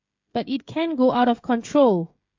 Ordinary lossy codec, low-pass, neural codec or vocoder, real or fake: MP3, 48 kbps; 7.2 kHz; codec, 16 kHz, 16 kbps, FreqCodec, smaller model; fake